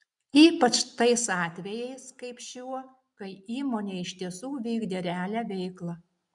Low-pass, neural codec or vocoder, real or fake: 10.8 kHz; none; real